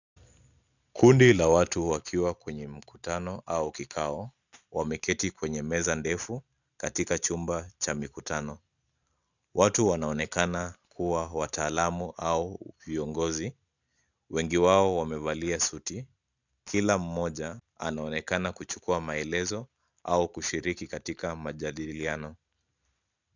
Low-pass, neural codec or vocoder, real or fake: 7.2 kHz; none; real